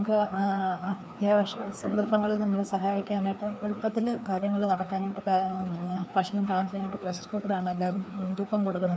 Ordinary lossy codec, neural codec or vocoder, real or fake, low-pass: none; codec, 16 kHz, 2 kbps, FreqCodec, larger model; fake; none